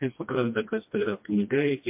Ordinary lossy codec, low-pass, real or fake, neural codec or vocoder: MP3, 32 kbps; 3.6 kHz; fake; codec, 16 kHz, 1 kbps, FreqCodec, smaller model